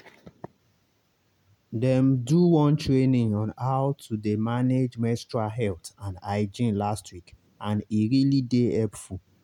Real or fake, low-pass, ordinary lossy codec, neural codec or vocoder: real; 19.8 kHz; none; none